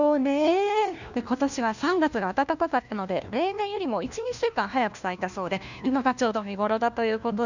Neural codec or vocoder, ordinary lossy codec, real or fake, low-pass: codec, 16 kHz, 1 kbps, FunCodec, trained on LibriTTS, 50 frames a second; none; fake; 7.2 kHz